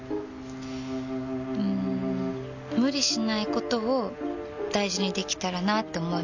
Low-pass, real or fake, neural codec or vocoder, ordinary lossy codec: 7.2 kHz; real; none; none